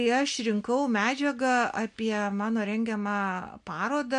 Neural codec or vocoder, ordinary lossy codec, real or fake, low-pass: none; MP3, 64 kbps; real; 9.9 kHz